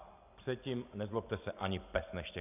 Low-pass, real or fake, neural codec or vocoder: 3.6 kHz; real; none